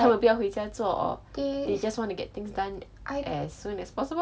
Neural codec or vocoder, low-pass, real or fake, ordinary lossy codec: none; none; real; none